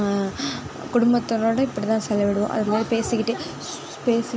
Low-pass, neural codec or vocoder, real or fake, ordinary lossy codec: none; none; real; none